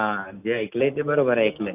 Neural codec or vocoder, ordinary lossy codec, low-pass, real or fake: none; none; 3.6 kHz; real